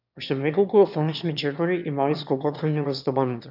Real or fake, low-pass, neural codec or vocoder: fake; 5.4 kHz; autoencoder, 22.05 kHz, a latent of 192 numbers a frame, VITS, trained on one speaker